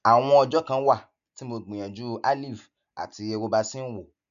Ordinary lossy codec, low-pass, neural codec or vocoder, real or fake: none; 7.2 kHz; none; real